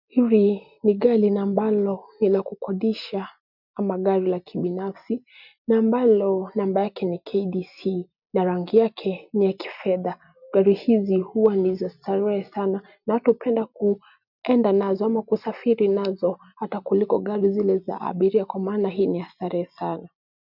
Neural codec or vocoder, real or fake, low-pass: none; real; 5.4 kHz